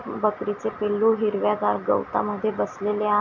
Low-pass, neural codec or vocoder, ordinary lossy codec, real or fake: 7.2 kHz; none; MP3, 48 kbps; real